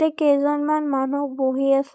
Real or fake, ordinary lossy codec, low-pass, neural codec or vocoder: fake; none; none; codec, 16 kHz, 4.8 kbps, FACodec